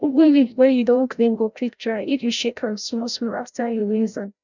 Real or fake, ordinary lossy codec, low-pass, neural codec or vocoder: fake; none; 7.2 kHz; codec, 16 kHz, 0.5 kbps, FreqCodec, larger model